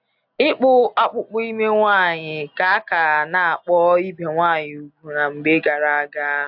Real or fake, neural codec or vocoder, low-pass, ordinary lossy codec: real; none; 5.4 kHz; AAC, 48 kbps